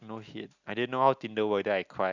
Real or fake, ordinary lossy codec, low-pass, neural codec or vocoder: real; Opus, 64 kbps; 7.2 kHz; none